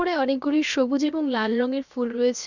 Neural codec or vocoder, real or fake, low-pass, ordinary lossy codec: codec, 16 kHz, about 1 kbps, DyCAST, with the encoder's durations; fake; 7.2 kHz; none